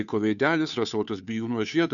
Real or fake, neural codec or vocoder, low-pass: fake; codec, 16 kHz, 2 kbps, FunCodec, trained on Chinese and English, 25 frames a second; 7.2 kHz